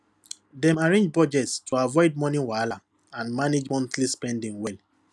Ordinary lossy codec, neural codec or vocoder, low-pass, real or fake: none; none; none; real